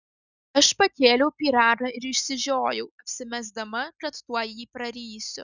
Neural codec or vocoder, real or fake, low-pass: none; real; 7.2 kHz